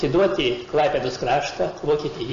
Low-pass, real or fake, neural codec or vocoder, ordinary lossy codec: 7.2 kHz; real; none; MP3, 64 kbps